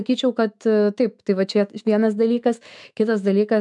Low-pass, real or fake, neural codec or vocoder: 10.8 kHz; fake; autoencoder, 48 kHz, 128 numbers a frame, DAC-VAE, trained on Japanese speech